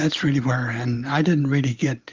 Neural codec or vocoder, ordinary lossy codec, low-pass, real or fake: none; Opus, 32 kbps; 7.2 kHz; real